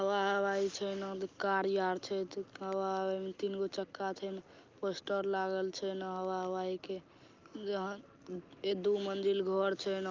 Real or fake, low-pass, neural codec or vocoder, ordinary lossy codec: real; 7.2 kHz; none; Opus, 24 kbps